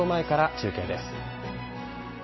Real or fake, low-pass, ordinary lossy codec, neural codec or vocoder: real; 7.2 kHz; MP3, 24 kbps; none